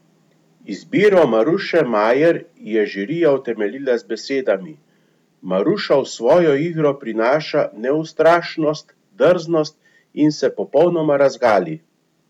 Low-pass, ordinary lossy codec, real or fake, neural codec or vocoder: 19.8 kHz; none; real; none